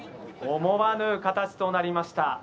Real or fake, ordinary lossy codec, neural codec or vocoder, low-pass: real; none; none; none